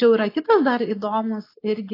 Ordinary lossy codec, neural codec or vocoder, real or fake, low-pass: AAC, 24 kbps; none; real; 5.4 kHz